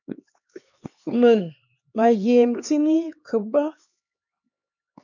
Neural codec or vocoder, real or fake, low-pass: codec, 16 kHz, 2 kbps, X-Codec, HuBERT features, trained on LibriSpeech; fake; 7.2 kHz